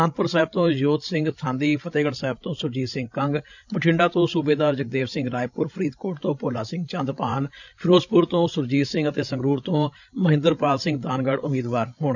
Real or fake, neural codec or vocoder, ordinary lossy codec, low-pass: fake; codec, 16 kHz, 16 kbps, FreqCodec, larger model; none; 7.2 kHz